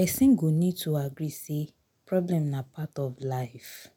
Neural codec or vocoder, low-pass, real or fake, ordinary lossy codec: none; none; real; none